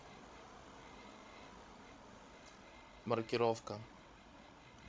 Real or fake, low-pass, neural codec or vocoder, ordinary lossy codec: fake; none; codec, 16 kHz, 16 kbps, FreqCodec, larger model; none